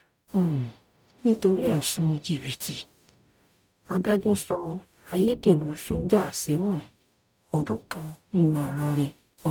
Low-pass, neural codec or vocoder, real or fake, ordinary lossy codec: 19.8 kHz; codec, 44.1 kHz, 0.9 kbps, DAC; fake; none